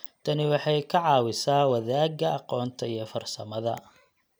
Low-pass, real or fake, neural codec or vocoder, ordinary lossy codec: none; real; none; none